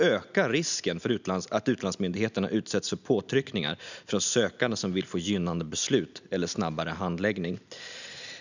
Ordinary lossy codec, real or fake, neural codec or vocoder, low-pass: none; real; none; 7.2 kHz